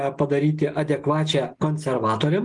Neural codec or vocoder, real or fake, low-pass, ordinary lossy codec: none; real; 10.8 kHz; Opus, 24 kbps